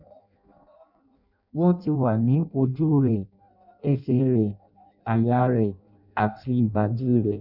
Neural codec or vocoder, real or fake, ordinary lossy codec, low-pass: codec, 16 kHz in and 24 kHz out, 0.6 kbps, FireRedTTS-2 codec; fake; none; 5.4 kHz